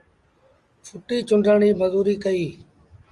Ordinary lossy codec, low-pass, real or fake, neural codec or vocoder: Opus, 32 kbps; 10.8 kHz; real; none